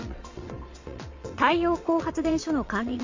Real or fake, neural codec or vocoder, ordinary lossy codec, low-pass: fake; vocoder, 22.05 kHz, 80 mel bands, WaveNeXt; MP3, 48 kbps; 7.2 kHz